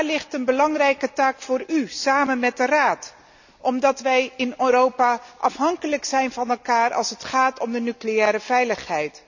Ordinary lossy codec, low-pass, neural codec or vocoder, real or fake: none; 7.2 kHz; none; real